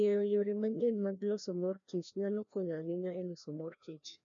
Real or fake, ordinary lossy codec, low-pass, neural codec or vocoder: fake; none; 7.2 kHz; codec, 16 kHz, 1 kbps, FreqCodec, larger model